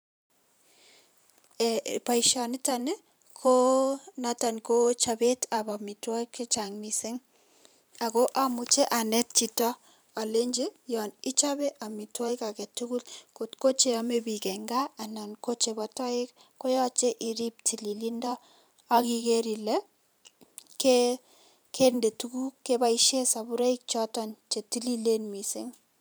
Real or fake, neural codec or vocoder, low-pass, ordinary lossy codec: fake; vocoder, 44.1 kHz, 128 mel bands every 512 samples, BigVGAN v2; none; none